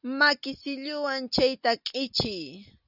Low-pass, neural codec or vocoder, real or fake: 5.4 kHz; none; real